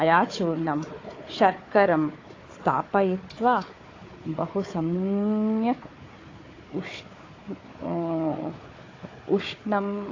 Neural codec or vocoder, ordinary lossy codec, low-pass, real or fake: codec, 16 kHz, 4 kbps, FunCodec, trained on Chinese and English, 50 frames a second; AAC, 32 kbps; 7.2 kHz; fake